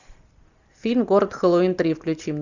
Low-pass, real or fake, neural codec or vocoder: 7.2 kHz; real; none